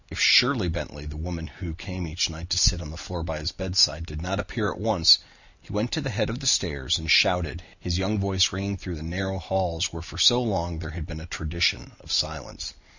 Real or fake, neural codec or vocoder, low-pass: real; none; 7.2 kHz